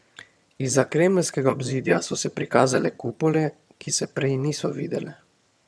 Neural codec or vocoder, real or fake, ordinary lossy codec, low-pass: vocoder, 22.05 kHz, 80 mel bands, HiFi-GAN; fake; none; none